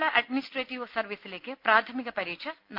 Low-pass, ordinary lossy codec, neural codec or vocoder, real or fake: 5.4 kHz; Opus, 32 kbps; none; real